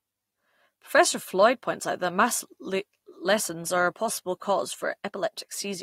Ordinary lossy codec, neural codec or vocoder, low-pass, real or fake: AAC, 48 kbps; none; 19.8 kHz; real